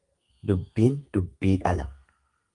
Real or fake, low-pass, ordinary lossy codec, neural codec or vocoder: fake; 10.8 kHz; Opus, 32 kbps; codec, 32 kHz, 1.9 kbps, SNAC